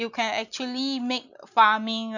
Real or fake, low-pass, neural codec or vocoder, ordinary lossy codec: real; 7.2 kHz; none; none